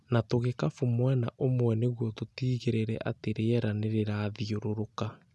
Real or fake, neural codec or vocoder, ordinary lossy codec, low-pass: real; none; none; none